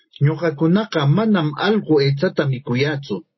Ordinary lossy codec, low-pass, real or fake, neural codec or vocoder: MP3, 24 kbps; 7.2 kHz; real; none